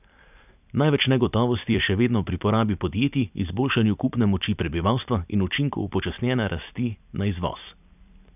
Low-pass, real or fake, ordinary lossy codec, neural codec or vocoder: 3.6 kHz; fake; none; vocoder, 44.1 kHz, 128 mel bands every 256 samples, BigVGAN v2